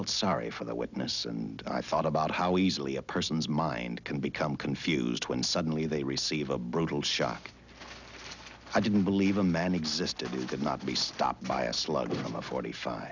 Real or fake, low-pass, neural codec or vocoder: real; 7.2 kHz; none